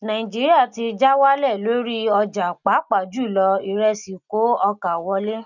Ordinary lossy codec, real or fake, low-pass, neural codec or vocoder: none; real; 7.2 kHz; none